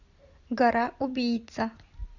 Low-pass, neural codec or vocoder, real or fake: 7.2 kHz; none; real